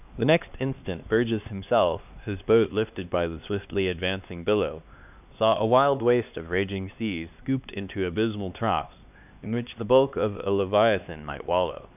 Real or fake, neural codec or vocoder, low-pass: fake; codec, 16 kHz, 2 kbps, X-Codec, HuBERT features, trained on LibriSpeech; 3.6 kHz